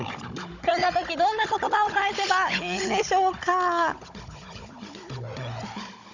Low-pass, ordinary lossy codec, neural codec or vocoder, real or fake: 7.2 kHz; none; codec, 16 kHz, 16 kbps, FunCodec, trained on Chinese and English, 50 frames a second; fake